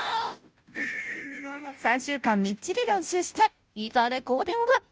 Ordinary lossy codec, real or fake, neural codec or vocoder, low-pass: none; fake; codec, 16 kHz, 0.5 kbps, FunCodec, trained on Chinese and English, 25 frames a second; none